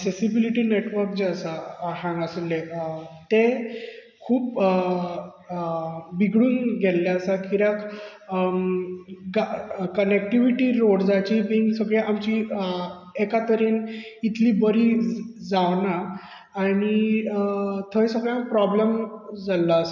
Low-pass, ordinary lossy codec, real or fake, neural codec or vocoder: 7.2 kHz; none; real; none